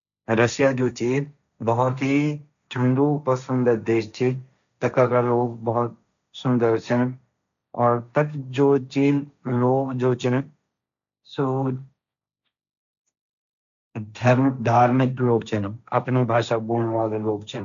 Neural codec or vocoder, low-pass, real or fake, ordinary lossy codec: codec, 16 kHz, 1.1 kbps, Voila-Tokenizer; 7.2 kHz; fake; none